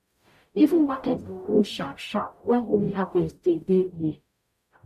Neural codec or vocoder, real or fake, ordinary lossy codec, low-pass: codec, 44.1 kHz, 0.9 kbps, DAC; fake; MP3, 96 kbps; 14.4 kHz